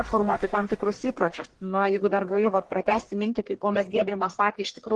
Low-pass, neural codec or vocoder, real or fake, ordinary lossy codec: 10.8 kHz; codec, 44.1 kHz, 1.7 kbps, Pupu-Codec; fake; Opus, 16 kbps